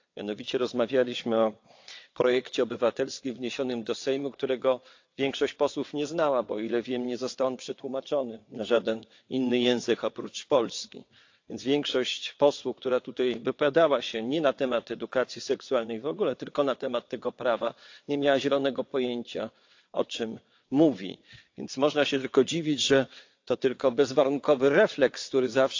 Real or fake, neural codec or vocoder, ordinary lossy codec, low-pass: fake; vocoder, 22.05 kHz, 80 mel bands, WaveNeXt; AAC, 48 kbps; 7.2 kHz